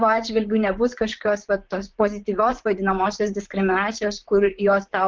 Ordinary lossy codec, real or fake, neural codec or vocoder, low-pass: Opus, 16 kbps; fake; vocoder, 44.1 kHz, 128 mel bands, Pupu-Vocoder; 7.2 kHz